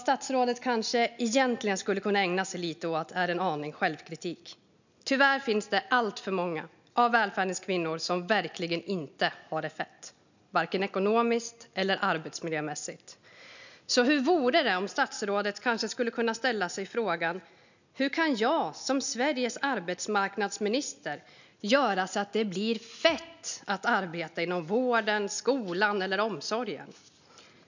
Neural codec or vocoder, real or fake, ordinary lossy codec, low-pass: none; real; none; 7.2 kHz